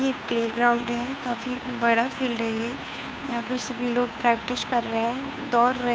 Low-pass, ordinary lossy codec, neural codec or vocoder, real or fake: none; none; codec, 16 kHz, 2 kbps, FunCodec, trained on Chinese and English, 25 frames a second; fake